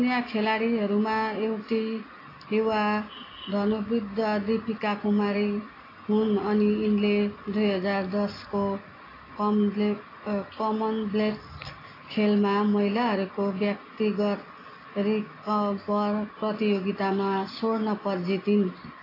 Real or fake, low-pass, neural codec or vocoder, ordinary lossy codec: real; 5.4 kHz; none; AAC, 24 kbps